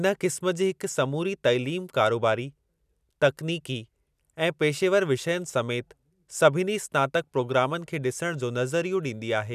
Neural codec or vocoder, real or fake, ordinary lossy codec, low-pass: none; real; none; 14.4 kHz